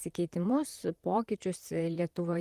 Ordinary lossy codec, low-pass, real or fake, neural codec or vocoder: Opus, 24 kbps; 14.4 kHz; fake; vocoder, 44.1 kHz, 128 mel bands, Pupu-Vocoder